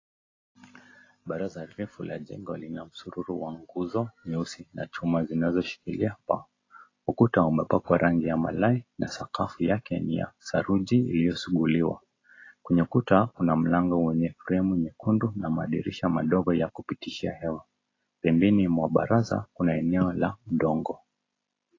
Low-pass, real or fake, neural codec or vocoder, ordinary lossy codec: 7.2 kHz; real; none; AAC, 32 kbps